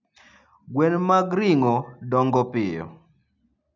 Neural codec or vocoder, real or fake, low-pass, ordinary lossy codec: none; real; 7.2 kHz; none